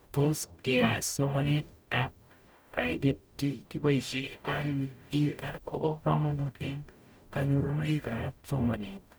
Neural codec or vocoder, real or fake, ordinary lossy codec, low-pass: codec, 44.1 kHz, 0.9 kbps, DAC; fake; none; none